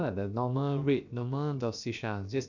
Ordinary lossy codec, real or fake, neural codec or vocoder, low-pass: none; fake; codec, 16 kHz, about 1 kbps, DyCAST, with the encoder's durations; 7.2 kHz